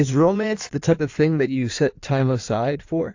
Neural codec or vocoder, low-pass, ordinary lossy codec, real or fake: codec, 16 kHz in and 24 kHz out, 1.1 kbps, FireRedTTS-2 codec; 7.2 kHz; AAC, 48 kbps; fake